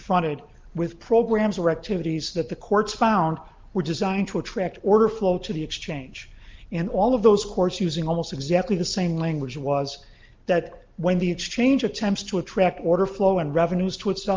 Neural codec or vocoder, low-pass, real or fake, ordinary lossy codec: none; 7.2 kHz; real; Opus, 32 kbps